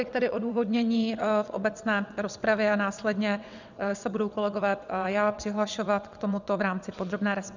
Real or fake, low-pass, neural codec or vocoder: fake; 7.2 kHz; vocoder, 22.05 kHz, 80 mel bands, WaveNeXt